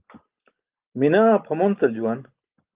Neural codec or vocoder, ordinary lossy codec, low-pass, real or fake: none; Opus, 32 kbps; 3.6 kHz; real